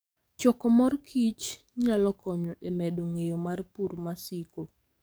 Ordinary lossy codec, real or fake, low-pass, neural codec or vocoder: none; fake; none; codec, 44.1 kHz, 7.8 kbps, DAC